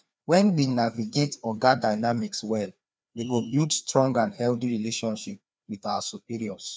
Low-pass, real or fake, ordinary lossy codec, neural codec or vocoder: none; fake; none; codec, 16 kHz, 2 kbps, FreqCodec, larger model